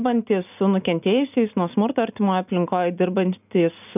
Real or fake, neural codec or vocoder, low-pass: real; none; 3.6 kHz